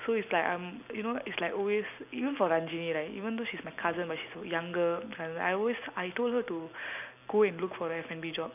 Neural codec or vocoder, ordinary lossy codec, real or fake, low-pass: none; none; real; 3.6 kHz